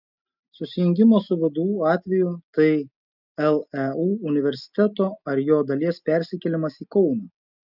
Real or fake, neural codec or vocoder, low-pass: real; none; 5.4 kHz